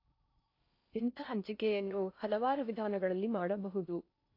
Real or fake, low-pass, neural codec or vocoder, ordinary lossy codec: fake; 5.4 kHz; codec, 16 kHz in and 24 kHz out, 0.6 kbps, FocalCodec, streaming, 4096 codes; AAC, 32 kbps